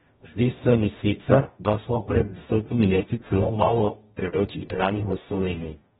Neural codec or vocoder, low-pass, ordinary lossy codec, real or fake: codec, 44.1 kHz, 0.9 kbps, DAC; 19.8 kHz; AAC, 16 kbps; fake